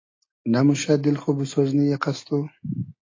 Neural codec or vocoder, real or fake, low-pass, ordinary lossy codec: none; real; 7.2 kHz; AAC, 32 kbps